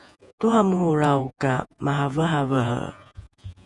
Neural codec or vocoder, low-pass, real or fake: vocoder, 48 kHz, 128 mel bands, Vocos; 10.8 kHz; fake